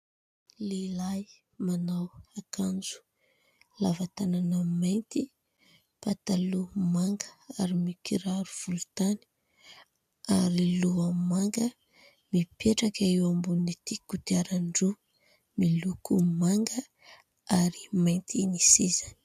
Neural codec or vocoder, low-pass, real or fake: none; 14.4 kHz; real